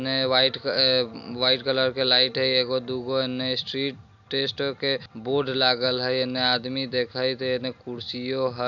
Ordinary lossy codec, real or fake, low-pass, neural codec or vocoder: none; real; 7.2 kHz; none